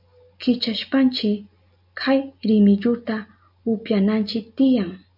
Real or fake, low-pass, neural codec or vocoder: real; 5.4 kHz; none